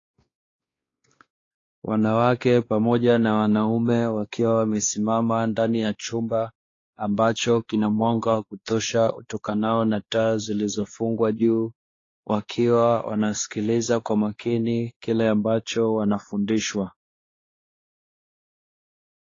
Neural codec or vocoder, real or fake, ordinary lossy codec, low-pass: codec, 16 kHz, 2 kbps, X-Codec, WavLM features, trained on Multilingual LibriSpeech; fake; AAC, 32 kbps; 7.2 kHz